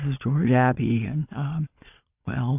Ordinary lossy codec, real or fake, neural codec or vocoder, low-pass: AAC, 32 kbps; fake; vocoder, 22.05 kHz, 80 mel bands, Vocos; 3.6 kHz